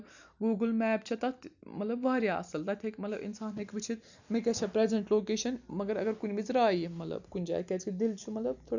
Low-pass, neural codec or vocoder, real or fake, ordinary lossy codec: 7.2 kHz; none; real; none